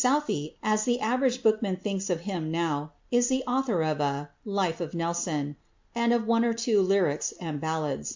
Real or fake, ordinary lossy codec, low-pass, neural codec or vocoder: real; MP3, 48 kbps; 7.2 kHz; none